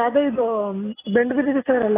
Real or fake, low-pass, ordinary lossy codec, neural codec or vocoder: real; 3.6 kHz; AAC, 16 kbps; none